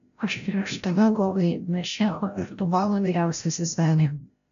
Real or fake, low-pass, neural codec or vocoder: fake; 7.2 kHz; codec, 16 kHz, 0.5 kbps, FreqCodec, larger model